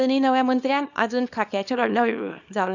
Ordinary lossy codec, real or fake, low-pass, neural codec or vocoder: none; fake; 7.2 kHz; codec, 24 kHz, 0.9 kbps, WavTokenizer, small release